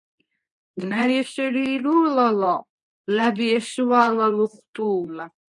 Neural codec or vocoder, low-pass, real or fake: codec, 24 kHz, 0.9 kbps, WavTokenizer, medium speech release version 2; 10.8 kHz; fake